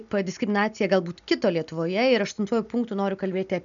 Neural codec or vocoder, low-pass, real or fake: none; 7.2 kHz; real